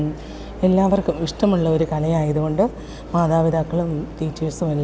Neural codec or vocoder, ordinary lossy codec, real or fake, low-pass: none; none; real; none